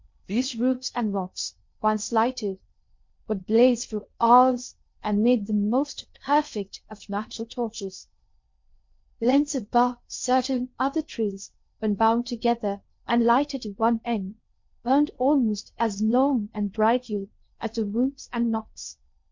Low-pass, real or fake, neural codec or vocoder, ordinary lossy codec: 7.2 kHz; fake; codec, 16 kHz in and 24 kHz out, 0.6 kbps, FocalCodec, streaming, 2048 codes; MP3, 48 kbps